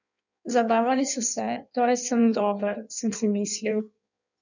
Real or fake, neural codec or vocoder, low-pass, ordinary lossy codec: fake; codec, 16 kHz in and 24 kHz out, 1.1 kbps, FireRedTTS-2 codec; 7.2 kHz; none